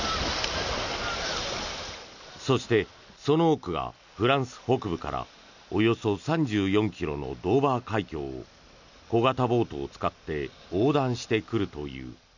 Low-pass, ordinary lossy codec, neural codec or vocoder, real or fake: 7.2 kHz; none; none; real